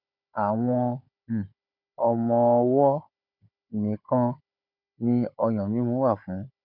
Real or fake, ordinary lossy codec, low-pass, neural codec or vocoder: fake; none; 5.4 kHz; codec, 16 kHz, 16 kbps, FunCodec, trained on Chinese and English, 50 frames a second